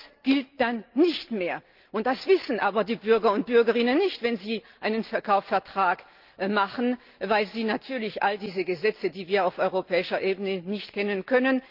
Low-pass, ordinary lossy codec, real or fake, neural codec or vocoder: 5.4 kHz; Opus, 32 kbps; real; none